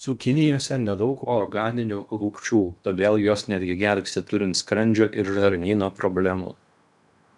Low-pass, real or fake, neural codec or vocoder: 10.8 kHz; fake; codec, 16 kHz in and 24 kHz out, 0.8 kbps, FocalCodec, streaming, 65536 codes